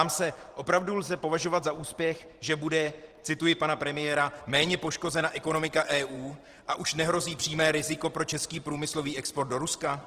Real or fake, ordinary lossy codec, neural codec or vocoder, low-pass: fake; Opus, 16 kbps; vocoder, 44.1 kHz, 128 mel bands every 512 samples, BigVGAN v2; 14.4 kHz